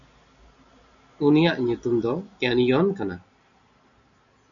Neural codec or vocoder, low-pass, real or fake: none; 7.2 kHz; real